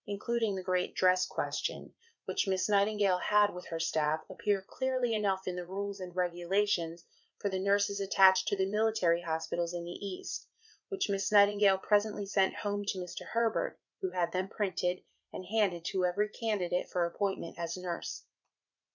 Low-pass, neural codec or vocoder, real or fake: 7.2 kHz; vocoder, 44.1 kHz, 80 mel bands, Vocos; fake